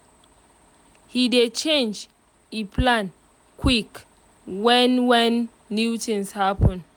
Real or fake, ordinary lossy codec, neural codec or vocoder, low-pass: real; none; none; 19.8 kHz